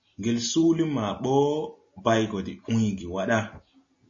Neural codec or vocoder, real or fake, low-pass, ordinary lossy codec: none; real; 7.2 kHz; MP3, 32 kbps